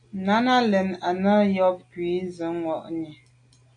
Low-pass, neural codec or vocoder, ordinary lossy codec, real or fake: 9.9 kHz; none; AAC, 64 kbps; real